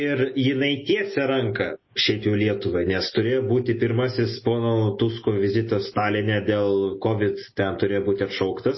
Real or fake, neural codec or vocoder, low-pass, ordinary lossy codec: real; none; 7.2 kHz; MP3, 24 kbps